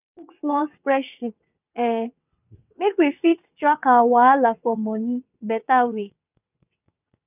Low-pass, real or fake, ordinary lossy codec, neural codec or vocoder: 3.6 kHz; fake; none; vocoder, 22.05 kHz, 80 mel bands, WaveNeXt